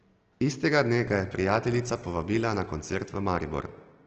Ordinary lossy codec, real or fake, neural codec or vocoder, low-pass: Opus, 16 kbps; real; none; 7.2 kHz